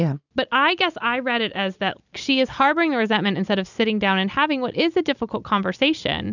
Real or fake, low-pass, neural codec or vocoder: real; 7.2 kHz; none